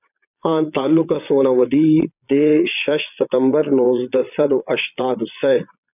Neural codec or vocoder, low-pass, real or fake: vocoder, 22.05 kHz, 80 mel bands, Vocos; 3.6 kHz; fake